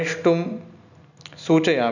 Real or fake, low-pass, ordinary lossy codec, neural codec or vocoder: real; 7.2 kHz; none; none